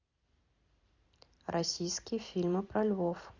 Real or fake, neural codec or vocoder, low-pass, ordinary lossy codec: fake; vocoder, 44.1 kHz, 128 mel bands every 256 samples, BigVGAN v2; 7.2 kHz; Opus, 64 kbps